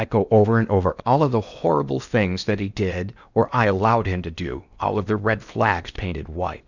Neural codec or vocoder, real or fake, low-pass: codec, 16 kHz in and 24 kHz out, 0.8 kbps, FocalCodec, streaming, 65536 codes; fake; 7.2 kHz